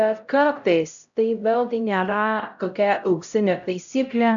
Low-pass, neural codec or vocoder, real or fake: 7.2 kHz; codec, 16 kHz, 0.5 kbps, X-Codec, HuBERT features, trained on LibriSpeech; fake